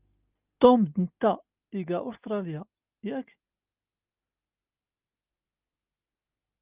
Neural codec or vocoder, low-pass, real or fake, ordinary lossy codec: none; 3.6 kHz; real; Opus, 24 kbps